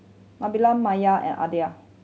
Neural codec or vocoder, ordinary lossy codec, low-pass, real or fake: none; none; none; real